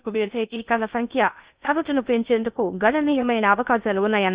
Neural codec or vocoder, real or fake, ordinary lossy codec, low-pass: codec, 16 kHz in and 24 kHz out, 0.6 kbps, FocalCodec, streaming, 2048 codes; fake; Opus, 64 kbps; 3.6 kHz